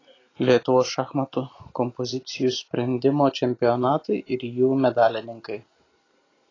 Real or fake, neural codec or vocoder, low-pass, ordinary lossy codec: real; none; 7.2 kHz; AAC, 32 kbps